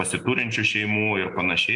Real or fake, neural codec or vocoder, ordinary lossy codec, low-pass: real; none; MP3, 64 kbps; 10.8 kHz